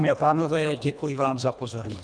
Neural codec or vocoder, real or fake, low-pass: codec, 24 kHz, 1.5 kbps, HILCodec; fake; 9.9 kHz